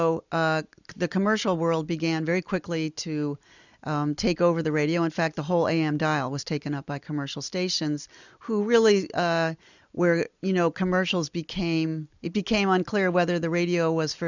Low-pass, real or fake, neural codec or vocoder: 7.2 kHz; real; none